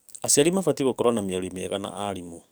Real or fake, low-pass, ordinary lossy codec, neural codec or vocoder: fake; none; none; codec, 44.1 kHz, 7.8 kbps, DAC